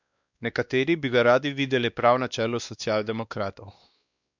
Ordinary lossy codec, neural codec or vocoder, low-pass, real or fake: none; codec, 16 kHz, 2 kbps, X-Codec, WavLM features, trained on Multilingual LibriSpeech; 7.2 kHz; fake